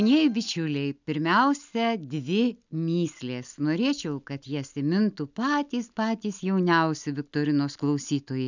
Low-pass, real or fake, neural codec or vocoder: 7.2 kHz; real; none